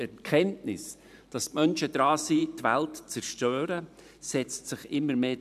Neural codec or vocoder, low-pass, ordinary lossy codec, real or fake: vocoder, 44.1 kHz, 128 mel bands every 512 samples, BigVGAN v2; 14.4 kHz; none; fake